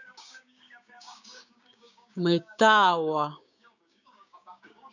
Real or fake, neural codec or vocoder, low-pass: fake; codec, 16 kHz, 6 kbps, DAC; 7.2 kHz